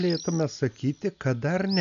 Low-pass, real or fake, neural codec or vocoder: 7.2 kHz; real; none